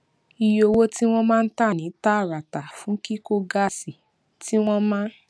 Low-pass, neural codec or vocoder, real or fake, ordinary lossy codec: none; none; real; none